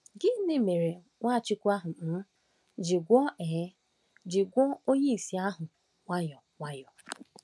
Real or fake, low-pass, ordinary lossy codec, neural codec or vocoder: real; none; none; none